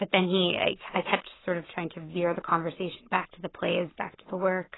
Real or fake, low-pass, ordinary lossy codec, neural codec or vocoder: fake; 7.2 kHz; AAC, 16 kbps; codec, 44.1 kHz, 7.8 kbps, Pupu-Codec